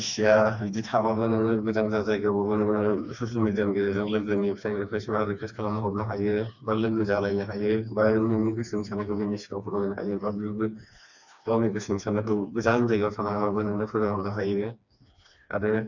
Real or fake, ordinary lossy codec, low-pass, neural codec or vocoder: fake; none; 7.2 kHz; codec, 16 kHz, 2 kbps, FreqCodec, smaller model